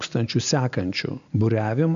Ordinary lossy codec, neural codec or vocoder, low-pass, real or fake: AAC, 96 kbps; none; 7.2 kHz; real